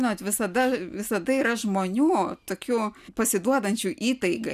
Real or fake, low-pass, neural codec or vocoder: real; 14.4 kHz; none